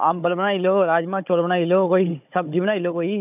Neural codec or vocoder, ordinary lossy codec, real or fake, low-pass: codec, 16 kHz, 16 kbps, FunCodec, trained on Chinese and English, 50 frames a second; none; fake; 3.6 kHz